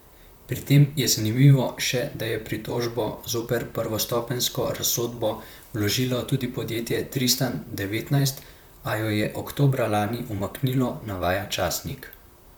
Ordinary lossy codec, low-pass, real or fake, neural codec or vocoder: none; none; fake; vocoder, 44.1 kHz, 128 mel bands, Pupu-Vocoder